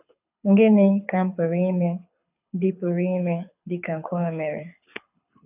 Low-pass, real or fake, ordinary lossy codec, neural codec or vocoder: 3.6 kHz; fake; AAC, 32 kbps; codec, 24 kHz, 6 kbps, HILCodec